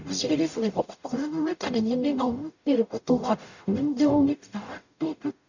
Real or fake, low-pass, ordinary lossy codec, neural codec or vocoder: fake; 7.2 kHz; none; codec, 44.1 kHz, 0.9 kbps, DAC